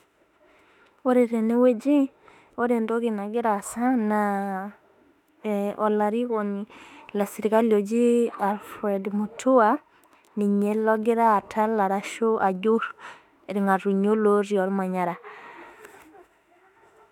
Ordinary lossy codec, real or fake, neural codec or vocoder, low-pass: none; fake; autoencoder, 48 kHz, 32 numbers a frame, DAC-VAE, trained on Japanese speech; 19.8 kHz